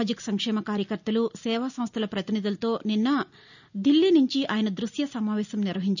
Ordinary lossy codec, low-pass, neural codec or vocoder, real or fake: none; 7.2 kHz; none; real